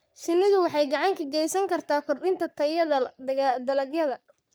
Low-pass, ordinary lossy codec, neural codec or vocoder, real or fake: none; none; codec, 44.1 kHz, 3.4 kbps, Pupu-Codec; fake